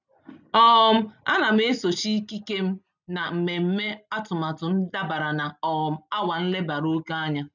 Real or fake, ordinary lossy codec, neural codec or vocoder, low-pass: real; none; none; 7.2 kHz